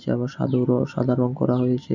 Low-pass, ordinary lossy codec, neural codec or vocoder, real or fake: 7.2 kHz; none; none; real